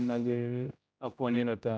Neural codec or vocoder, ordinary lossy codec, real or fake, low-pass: codec, 16 kHz, 0.5 kbps, X-Codec, HuBERT features, trained on general audio; none; fake; none